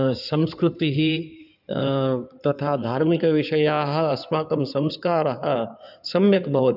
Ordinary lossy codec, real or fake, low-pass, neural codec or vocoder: none; fake; 5.4 kHz; codec, 16 kHz in and 24 kHz out, 2.2 kbps, FireRedTTS-2 codec